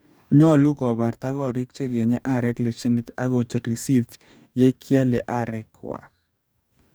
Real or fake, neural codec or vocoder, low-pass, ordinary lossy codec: fake; codec, 44.1 kHz, 2.6 kbps, DAC; none; none